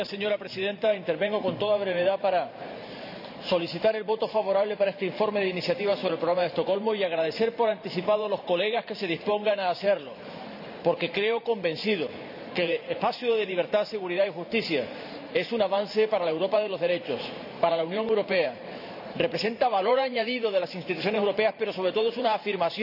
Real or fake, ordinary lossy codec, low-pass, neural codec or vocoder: fake; none; 5.4 kHz; vocoder, 44.1 kHz, 128 mel bands every 512 samples, BigVGAN v2